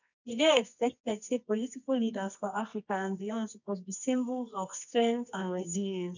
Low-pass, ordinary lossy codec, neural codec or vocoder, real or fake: 7.2 kHz; none; codec, 24 kHz, 0.9 kbps, WavTokenizer, medium music audio release; fake